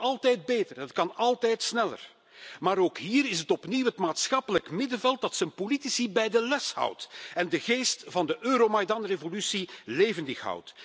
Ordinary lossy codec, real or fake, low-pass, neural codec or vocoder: none; real; none; none